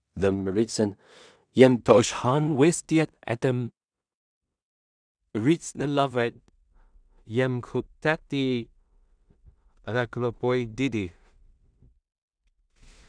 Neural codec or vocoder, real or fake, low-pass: codec, 16 kHz in and 24 kHz out, 0.4 kbps, LongCat-Audio-Codec, two codebook decoder; fake; 9.9 kHz